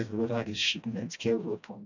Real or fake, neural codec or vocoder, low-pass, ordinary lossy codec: fake; codec, 16 kHz, 1 kbps, FreqCodec, smaller model; 7.2 kHz; none